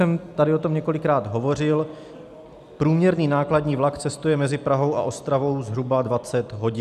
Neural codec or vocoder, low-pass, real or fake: none; 14.4 kHz; real